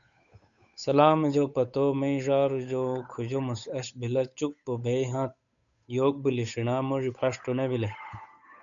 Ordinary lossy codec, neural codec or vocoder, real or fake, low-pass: MP3, 96 kbps; codec, 16 kHz, 8 kbps, FunCodec, trained on Chinese and English, 25 frames a second; fake; 7.2 kHz